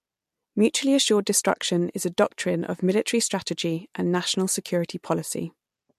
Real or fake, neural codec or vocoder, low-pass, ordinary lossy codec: real; none; 14.4 kHz; MP3, 64 kbps